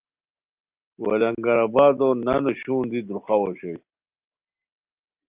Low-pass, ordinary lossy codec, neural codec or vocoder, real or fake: 3.6 kHz; Opus, 32 kbps; none; real